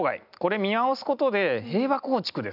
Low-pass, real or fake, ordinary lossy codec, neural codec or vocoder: 5.4 kHz; real; none; none